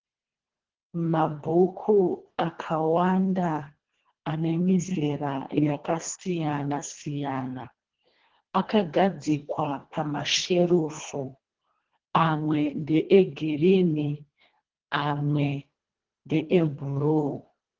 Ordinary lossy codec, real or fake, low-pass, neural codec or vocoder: Opus, 16 kbps; fake; 7.2 kHz; codec, 24 kHz, 1.5 kbps, HILCodec